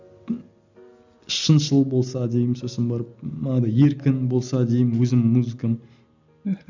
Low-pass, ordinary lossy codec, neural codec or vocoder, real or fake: 7.2 kHz; none; none; real